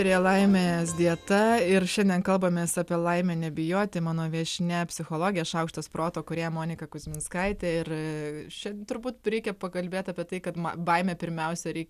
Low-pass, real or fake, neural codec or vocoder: 14.4 kHz; real; none